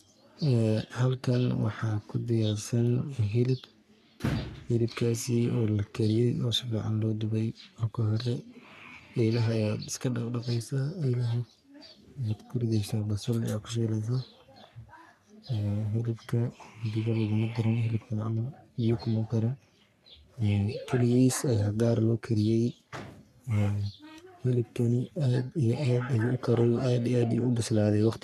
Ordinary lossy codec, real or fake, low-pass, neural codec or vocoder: none; fake; 14.4 kHz; codec, 44.1 kHz, 3.4 kbps, Pupu-Codec